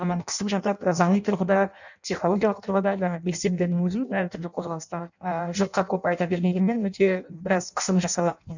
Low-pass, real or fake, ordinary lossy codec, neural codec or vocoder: 7.2 kHz; fake; none; codec, 16 kHz in and 24 kHz out, 0.6 kbps, FireRedTTS-2 codec